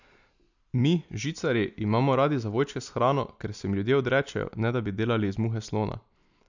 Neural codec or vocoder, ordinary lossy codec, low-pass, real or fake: none; none; 7.2 kHz; real